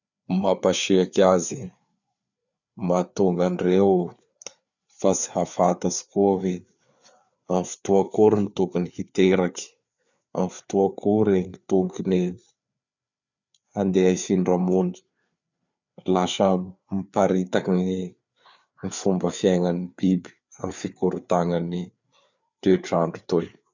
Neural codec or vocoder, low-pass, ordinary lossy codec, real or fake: codec, 16 kHz, 4 kbps, FreqCodec, larger model; 7.2 kHz; none; fake